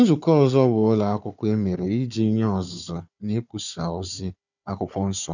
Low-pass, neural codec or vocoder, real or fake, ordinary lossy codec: 7.2 kHz; codec, 16 kHz, 4 kbps, FunCodec, trained on Chinese and English, 50 frames a second; fake; none